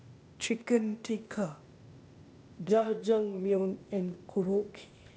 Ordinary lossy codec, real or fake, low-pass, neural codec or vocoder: none; fake; none; codec, 16 kHz, 0.8 kbps, ZipCodec